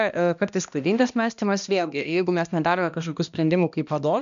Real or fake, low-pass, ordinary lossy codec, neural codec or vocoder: fake; 7.2 kHz; AAC, 96 kbps; codec, 16 kHz, 1 kbps, X-Codec, HuBERT features, trained on balanced general audio